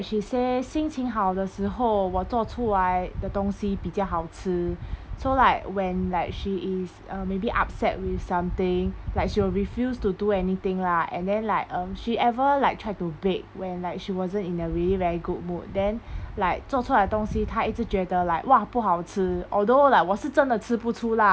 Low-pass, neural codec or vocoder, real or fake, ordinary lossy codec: none; none; real; none